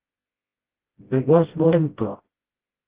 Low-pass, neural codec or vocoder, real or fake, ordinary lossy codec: 3.6 kHz; codec, 16 kHz, 0.5 kbps, FreqCodec, smaller model; fake; Opus, 16 kbps